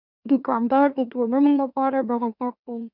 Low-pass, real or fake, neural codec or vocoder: 5.4 kHz; fake; autoencoder, 44.1 kHz, a latent of 192 numbers a frame, MeloTTS